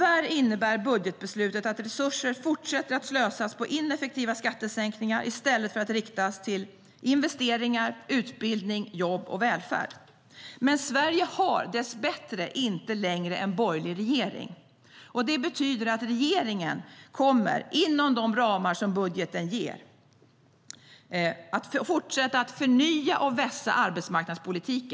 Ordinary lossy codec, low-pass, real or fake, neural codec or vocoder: none; none; real; none